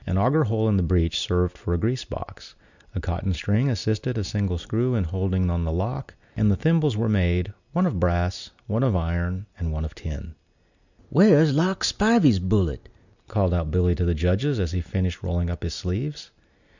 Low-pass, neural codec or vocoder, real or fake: 7.2 kHz; none; real